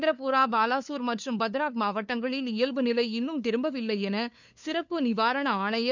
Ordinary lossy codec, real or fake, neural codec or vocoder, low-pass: none; fake; codec, 16 kHz, 2 kbps, FunCodec, trained on LibriTTS, 25 frames a second; 7.2 kHz